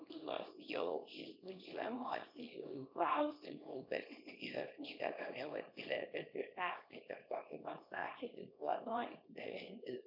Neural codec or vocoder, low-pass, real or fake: codec, 24 kHz, 0.9 kbps, WavTokenizer, small release; 5.4 kHz; fake